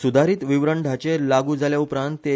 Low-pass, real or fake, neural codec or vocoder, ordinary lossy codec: none; real; none; none